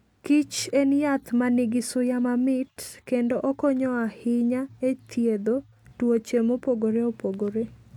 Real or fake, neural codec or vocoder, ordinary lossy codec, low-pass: real; none; none; 19.8 kHz